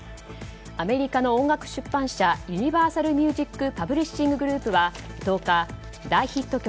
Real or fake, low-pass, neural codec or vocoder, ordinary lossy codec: real; none; none; none